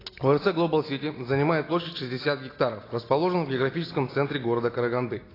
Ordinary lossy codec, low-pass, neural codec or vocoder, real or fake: AAC, 24 kbps; 5.4 kHz; none; real